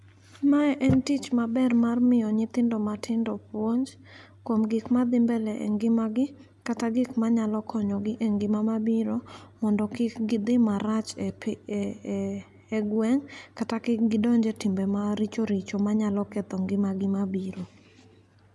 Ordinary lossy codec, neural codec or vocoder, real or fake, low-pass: none; none; real; none